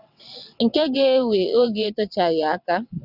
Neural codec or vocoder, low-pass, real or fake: vocoder, 22.05 kHz, 80 mel bands, WaveNeXt; 5.4 kHz; fake